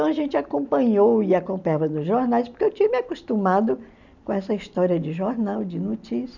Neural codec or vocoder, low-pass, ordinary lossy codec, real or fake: none; 7.2 kHz; none; real